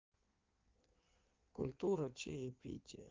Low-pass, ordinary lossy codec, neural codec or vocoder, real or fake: 7.2 kHz; Opus, 32 kbps; codec, 16 kHz in and 24 kHz out, 1.1 kbps, FireRedTTS-2 codec; fake